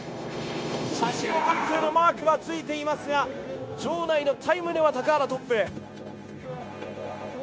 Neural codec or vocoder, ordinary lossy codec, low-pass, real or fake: codec, 16 kHz, 0.9 kbps, LongCat-Audio-Codec; none; none; fake